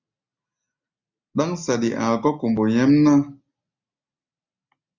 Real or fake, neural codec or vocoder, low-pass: real; none; 7.2 kHz